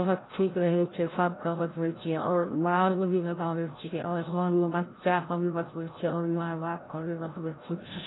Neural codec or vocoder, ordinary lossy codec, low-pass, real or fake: codec, 16 kHz, 0.5 kbps, FreqCodec, larger model; AAC, 16 kbps; 7.2 kHz; fake